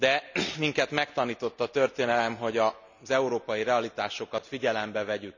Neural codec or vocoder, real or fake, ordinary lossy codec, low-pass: none; real; none; 7.2 kHz